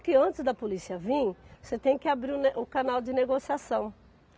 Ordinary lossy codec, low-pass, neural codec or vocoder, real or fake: none; none; none; real